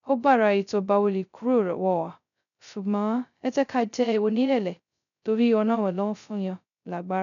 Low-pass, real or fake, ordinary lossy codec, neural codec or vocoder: 7.2 kHz; fake; none; codec, 16 kHz, 0.2 kbps, FocalCodec